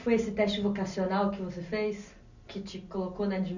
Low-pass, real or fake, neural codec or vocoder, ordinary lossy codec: 7.2 kHz; real; none; none